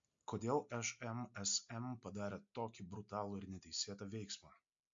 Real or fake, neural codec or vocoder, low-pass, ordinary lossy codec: real; none; 7.2 kHz; MP3, 48 kbps